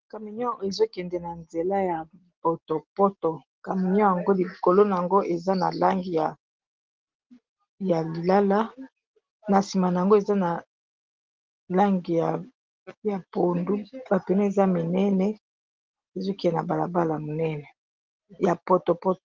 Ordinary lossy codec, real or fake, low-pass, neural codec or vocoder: Opus, 16 kbps; real; 7.2 kHz; none